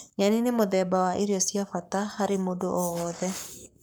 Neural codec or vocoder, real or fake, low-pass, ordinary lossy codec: codec, 44.1 kHz, 7.8 kbps, Pupu-Codec; fake; none; none